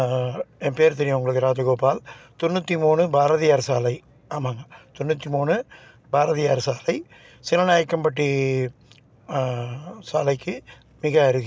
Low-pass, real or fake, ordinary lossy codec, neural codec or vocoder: none; real; none; none